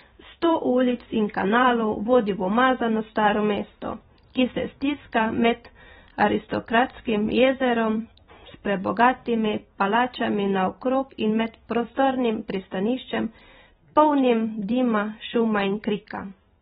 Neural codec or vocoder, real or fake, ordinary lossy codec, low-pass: vocoder, 44.1 kHz, 128 mel bands every 512 samples, BigVGAN v2; fake; AAC, 16 kbps; 19.8 kHz